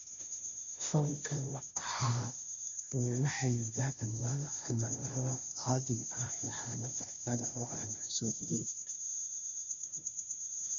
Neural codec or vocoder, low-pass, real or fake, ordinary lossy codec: codec, 16 kHz, 0.5 kbps, FunCodec, trained on Chinese and English, 25 frames a second; 7.2 kHz; fake; none